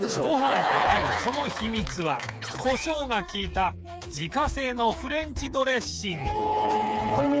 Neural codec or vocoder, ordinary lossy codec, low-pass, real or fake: codec, 16 kHz, 4 kbps, FreqCodec, smaller model; none; none; fake